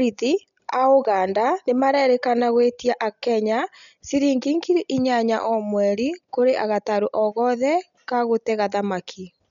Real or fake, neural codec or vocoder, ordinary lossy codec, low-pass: real; none; none; 7.2 kHz